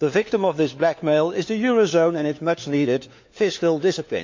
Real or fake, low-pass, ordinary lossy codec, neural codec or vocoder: fake; 7.2 kHz; MP3, 64 kbps; codec, 16 kHz, 4 kbps, FunCodec, trained on LibriTTS, 50 frames a second